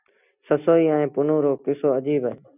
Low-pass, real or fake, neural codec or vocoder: 3.6 kHz; real; none